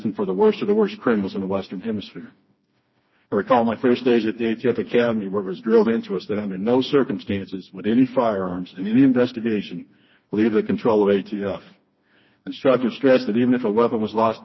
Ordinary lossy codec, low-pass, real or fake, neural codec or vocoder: MP3, 24 kbps; 7.2 kHz; fake; codec, 16 kHz, 2 kbps, FreqCodec, smaller model